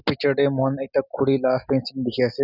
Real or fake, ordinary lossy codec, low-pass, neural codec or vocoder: real; none; 5.4 kHz; none